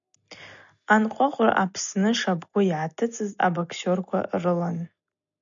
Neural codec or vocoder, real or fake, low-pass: none; real; 7.2 kHz